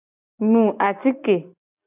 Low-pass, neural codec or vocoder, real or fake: 3.6 kHz; none; real